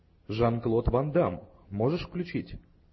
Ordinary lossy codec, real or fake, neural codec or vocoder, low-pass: MP3, 24 kbps; real; none; 7.2 kHz